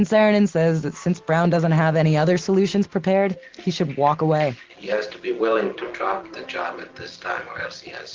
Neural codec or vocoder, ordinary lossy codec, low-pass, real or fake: none; Opus, 16 kbps; 7.2 kHz; real